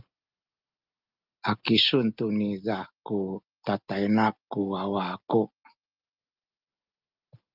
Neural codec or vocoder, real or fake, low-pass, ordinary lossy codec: none; real; 5.4 kHz; Opus, 24 kbps